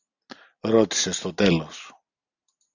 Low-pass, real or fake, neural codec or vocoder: 7.2 kHz; real; none